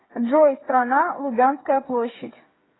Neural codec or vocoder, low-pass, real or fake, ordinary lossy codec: codec, 16 kHz, 8 kbps, FreqCodec, smaller model; 7.2 kHz; fake; AAC, 16 kbps